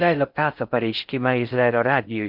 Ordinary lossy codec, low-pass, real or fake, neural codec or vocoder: Opus, 24 kbps; 5.4 kHz; fake; codec, 16 kHz in and 24 kHz out, 0.6 kbps, FocalCodec, streaming, 4096 codes